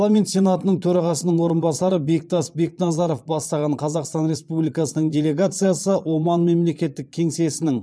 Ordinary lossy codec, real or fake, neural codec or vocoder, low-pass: none; fake; vocoder, 22.05 kHz, 80 mel bands, Vocos; none